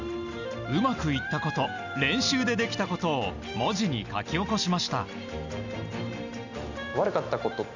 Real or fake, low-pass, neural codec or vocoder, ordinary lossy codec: real; 7.2 kHz; none; none